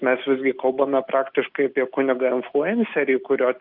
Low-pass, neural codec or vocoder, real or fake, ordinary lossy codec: 5.4 kHz; none; real; Opus, 32 kbps